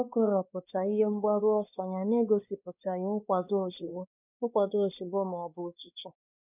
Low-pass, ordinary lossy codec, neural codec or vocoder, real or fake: 3.6 kHz; none; codec, 16 kHz, 2 kbps, X-Codec, WavLM features, trained on Multilingual LibriSpeech; fake